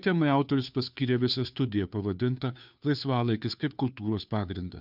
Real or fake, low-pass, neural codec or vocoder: fake; 5.4 kHz; codec, 16 kHz, 2 kbps, FunCodec, trained on Chinese and English, 25 frames a second